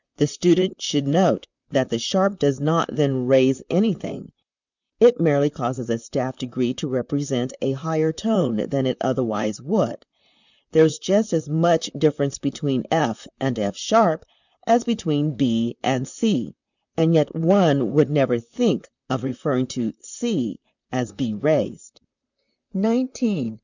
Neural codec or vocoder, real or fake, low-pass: vocoder, 44.1 kHz, 128 mel bands, Pupu-Vocoder; fake; 7.2 kHz